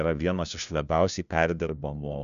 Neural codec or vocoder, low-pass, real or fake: codec, 16 kHz, 1 kbps, FunCodec, trained on LibriTTS, 50 frames a second; 7.2 kHz; fake